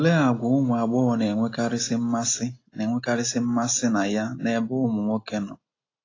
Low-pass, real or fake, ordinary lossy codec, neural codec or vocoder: 7.2 kHz; real; AAC, 32 kbps; none